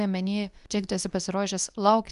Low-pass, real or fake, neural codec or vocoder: 10.8 kHz; fake; codec, 24 kHz, 0.9 kbps, WavTokenizer, medium speech release version 2